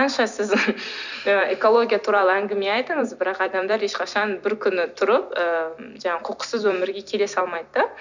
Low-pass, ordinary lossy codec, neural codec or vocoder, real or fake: 7.2 kHz; none; vocoder, 44.1 kHz, 128 mel bands every 256 samples, BigVGAN v2; fake